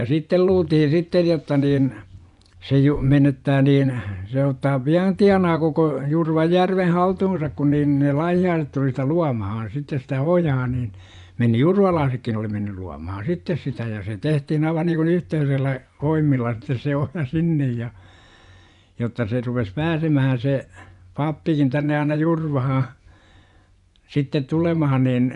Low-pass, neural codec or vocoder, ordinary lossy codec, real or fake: 10.8 kHz; vocoder, 24 kHz, 100 mel bands, Vocos; none; fake